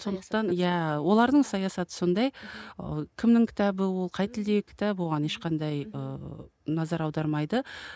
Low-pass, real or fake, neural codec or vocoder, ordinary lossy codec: none; real; none; none